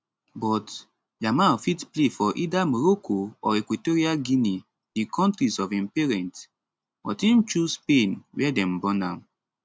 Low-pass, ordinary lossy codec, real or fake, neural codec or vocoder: none; none; real; none